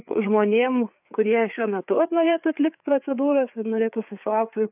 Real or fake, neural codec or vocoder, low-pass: fake; codec, 16 kHz, 4 kbps, FreqCodec, larger model; 3.6 kHz